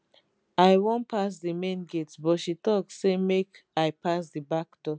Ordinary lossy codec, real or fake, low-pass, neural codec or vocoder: none; real; none; none